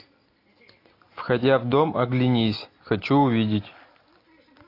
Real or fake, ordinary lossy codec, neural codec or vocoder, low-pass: real; AAC, 32 kbps; none; 5.4 kHz